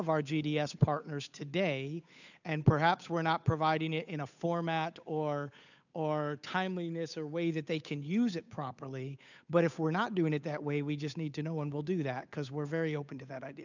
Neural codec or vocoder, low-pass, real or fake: codec, 16 kHz, 8 kbps, FunCodec, trained on Chinese and English, 25 frames a second; 7.2 kHz; fake